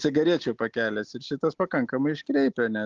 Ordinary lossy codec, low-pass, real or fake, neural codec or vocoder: Opus, 32 kbps; 7.2 kHz; real; none